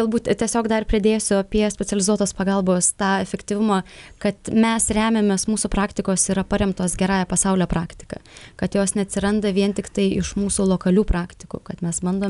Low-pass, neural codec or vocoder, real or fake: 10.8 kHz; none; real